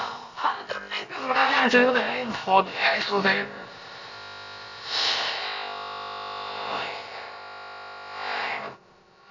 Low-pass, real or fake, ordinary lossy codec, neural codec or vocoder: 7.2 kHz; fake; MP3, 48 kbps; codec, 16 kHz, about 1 kbps, DyCAST, with the encoder's durations